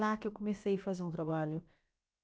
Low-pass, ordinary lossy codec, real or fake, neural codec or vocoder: none; none; fake; codec, 16 kHz, about 1 kbps, DyCAST, with the encoder's durations